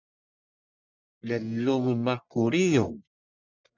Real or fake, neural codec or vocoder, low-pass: fake; codec, 44.1 kHz, 1.7 kbps, Pupu-Codec; 7.2 kHz